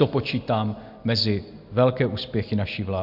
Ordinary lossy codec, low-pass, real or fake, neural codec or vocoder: MP3, 48 kbps; 5.4 kHz; real; none